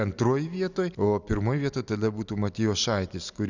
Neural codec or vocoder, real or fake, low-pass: none; real; 7.2 kHz